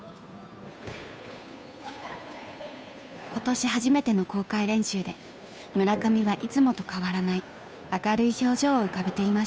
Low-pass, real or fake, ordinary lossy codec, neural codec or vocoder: none; fake; none; codec, 16 kHz, 2 kbps, FunCodec, trained on Chinese and English, 25 frames a second